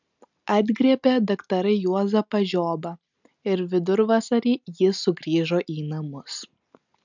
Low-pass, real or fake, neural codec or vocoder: 7.2 kHz; real; none